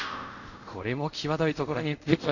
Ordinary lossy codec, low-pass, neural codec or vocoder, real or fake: none; 7.2 kHz; codec, 24 kHz, 0.5 kbps, DualCodec; fake